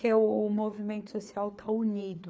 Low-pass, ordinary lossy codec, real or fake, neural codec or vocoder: none; none; fake; codec, 16 kHz, 4 kbps, FreqCodec, larger model